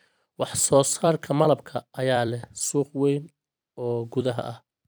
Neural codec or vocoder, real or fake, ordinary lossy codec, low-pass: vocoder, 44.1 kHz, 128 mel bands every 256 samples, BigVGAN v2; fake; none; none